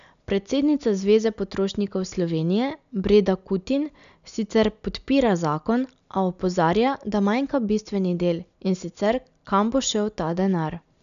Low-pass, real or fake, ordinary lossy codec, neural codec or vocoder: 7.2 kHz; real; none; none